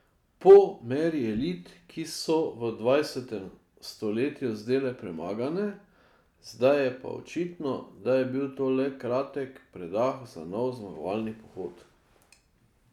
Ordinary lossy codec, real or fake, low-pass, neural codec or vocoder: none; real; 19.8 kHz; none